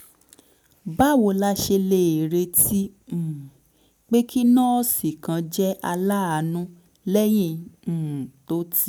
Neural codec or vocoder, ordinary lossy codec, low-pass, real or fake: none; none; none; real